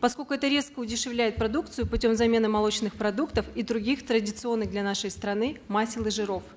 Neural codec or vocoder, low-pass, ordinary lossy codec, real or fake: none; none; none; real